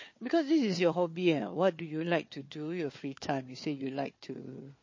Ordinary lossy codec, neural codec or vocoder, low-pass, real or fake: MP3, 32 kbps; codec, 16 kHz, 4 kbps, FunCodec, trained on Chinese and English, 50 frames a second; 7.2 kHz; fake